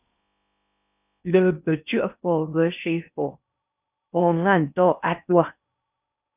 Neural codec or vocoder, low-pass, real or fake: codec, 16 kHz in and 24 kHz out, 0.6 kbps, FocalCodec, streaming, 4096 codes; 3.6 kHz; fake